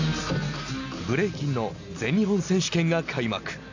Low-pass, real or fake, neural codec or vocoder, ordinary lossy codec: 7.2 kHz; real; none; AAC, 48 kbps